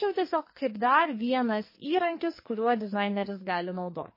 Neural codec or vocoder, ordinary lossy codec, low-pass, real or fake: codec, 44.1 kHz, 2.6 kbps, SNAC; MP3, 24 kbps; 5.4 kHz; fake